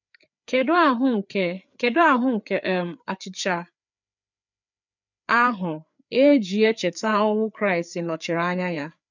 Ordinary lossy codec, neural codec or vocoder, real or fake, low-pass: none; codec, 16 kHz, 4 kbps, FreqCodec, larger model; fake; 7.2 kHz